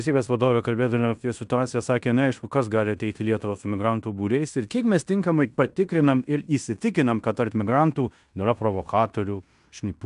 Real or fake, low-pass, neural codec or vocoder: fake; 10.8 kHz; codec, 16 kHz in and 24 kHz out, 0.9 kbps, LongCat-Audio-Codec, fine tuned four codebook decoder